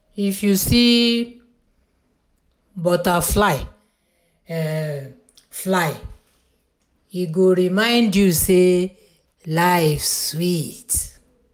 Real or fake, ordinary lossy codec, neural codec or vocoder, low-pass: real; none; none; none